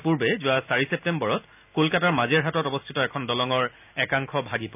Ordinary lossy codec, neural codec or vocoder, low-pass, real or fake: none; none; 3.6 kHz; real